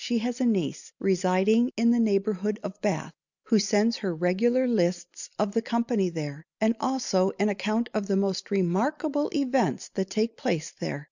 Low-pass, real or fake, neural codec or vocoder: 7.2 kHz; real; none